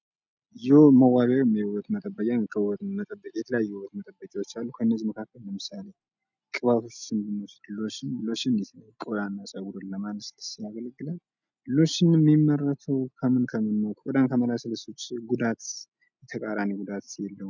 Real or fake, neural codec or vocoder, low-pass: real; none; 7.2 kHz